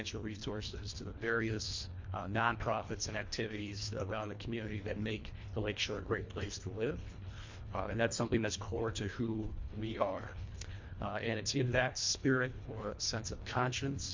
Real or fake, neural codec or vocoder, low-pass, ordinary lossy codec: fake; codec, 24 kHz, 1.5 kbps, HILCodec; 7.2 kHz; MP3, 48 kbps